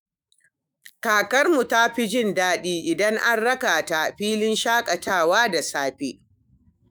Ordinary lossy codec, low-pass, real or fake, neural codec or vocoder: none; none; fake; autoencoder, 48 kHz, 128 numbers a frame, DAC-VAE, trained on Japanese speech